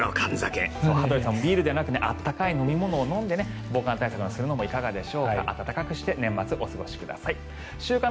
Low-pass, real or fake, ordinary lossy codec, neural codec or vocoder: none; real; none; none